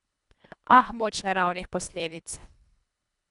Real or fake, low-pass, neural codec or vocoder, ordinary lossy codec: fake; 10.8 kHz; codec, 24 kHz, 1.5 kbps, HILCodec; none